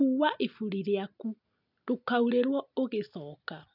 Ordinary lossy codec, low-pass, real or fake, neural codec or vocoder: none; 5.4 kHz; real; none